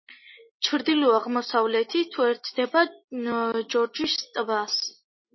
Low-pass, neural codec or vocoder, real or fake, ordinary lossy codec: 7.2 kHz; none; real; MP3, 24 kbps